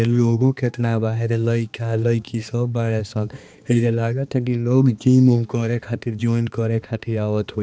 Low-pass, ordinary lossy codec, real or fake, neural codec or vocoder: none; none; fake; codec, 16 kHz, 2 kbps, X-Codec, HuBERT features, trained on balanced general audio